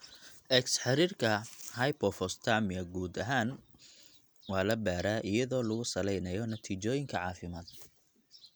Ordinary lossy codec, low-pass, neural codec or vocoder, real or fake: none; none; none; real